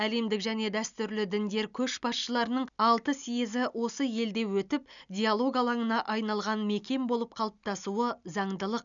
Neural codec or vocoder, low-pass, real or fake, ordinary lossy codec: none; 7.2 kHz; real; none